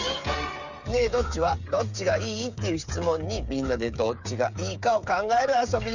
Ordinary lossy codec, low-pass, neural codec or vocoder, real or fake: none; 7.2 kHz; codec, 16 kHz, 8 kbps, FreqCodec, smaller model; fake